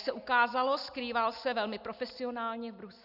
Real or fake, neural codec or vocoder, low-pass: real; none; 5.4 kHz